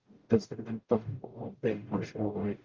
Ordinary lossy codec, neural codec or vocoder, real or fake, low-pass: Opus, 32 kbps; codec, 44.1 kHz, 0.9 kbps, DAC; fake; 7.2 kHz